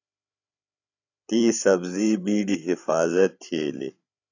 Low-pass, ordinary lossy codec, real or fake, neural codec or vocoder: 7.2 kHz; AAC, 48 kbps; fake; codec, 16 kHz, 8 kbps, FreqCodec, larger model